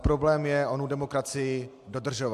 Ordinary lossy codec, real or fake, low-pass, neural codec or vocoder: MP3, 64 kbps; real; 14.4 kHz; none